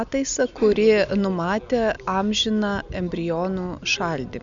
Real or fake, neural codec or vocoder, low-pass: real; none; 7.2 kHz